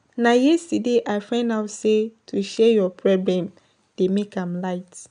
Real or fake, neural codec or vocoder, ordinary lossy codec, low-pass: real; none; none; 9.9 kHz